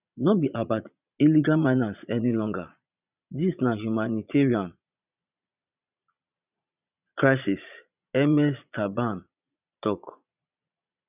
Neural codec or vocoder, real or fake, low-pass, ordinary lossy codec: vocoder, 22.05 kHz, 80 mel bands, Vocos; fake; 3.6 kHz; none